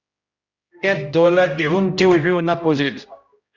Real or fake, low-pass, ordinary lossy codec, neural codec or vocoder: fake; 7.2 kHz; Opus, 64 kbps; codec, 16 kHz, 0.5 kbps, X-Codec, HuBERT features, trained on general audio